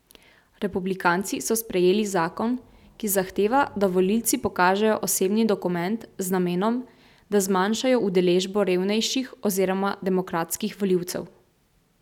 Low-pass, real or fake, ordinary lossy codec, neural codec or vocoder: 19.8 kHz; real; none; none